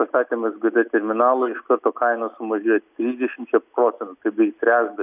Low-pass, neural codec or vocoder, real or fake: 3.6 kHz; none; real